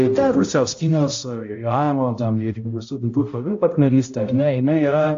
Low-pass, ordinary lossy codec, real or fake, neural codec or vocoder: 7.2 kHz; AAC, 48 kbps; fake; codec, 16 kHz, 0.5 kbps, X-Codec, HuBERT features, trained on balanced general audio